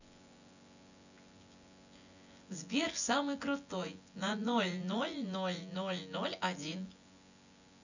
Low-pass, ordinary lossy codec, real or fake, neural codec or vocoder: 7.2 kHz; none; fake; vocoder, 24 kHz, 100 mel bands, Vocos